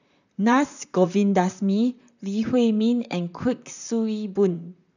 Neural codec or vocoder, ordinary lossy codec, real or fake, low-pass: vocoder, 44.1 kHz, 128 mel bands, Pupu-Vocoder; none; fake; 7.2 kHz